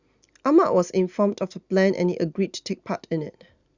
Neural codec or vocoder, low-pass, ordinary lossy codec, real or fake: none; 7.2 kHz; Opus, 64 kbps; real